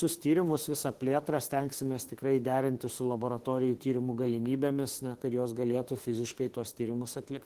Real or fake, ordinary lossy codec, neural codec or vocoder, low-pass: fake; Opus, 16 kbps; autoencoder, 48 kHz, 32 numbers a frame, DAC-VAE, trained on Japanese speech; 14.4 kHz